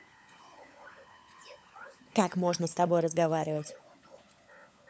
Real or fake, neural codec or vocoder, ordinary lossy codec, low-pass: fake; codec, 16 kHz, 16 kbps, FunCodec, trained on LibriTTS, 50 frames a second; none; none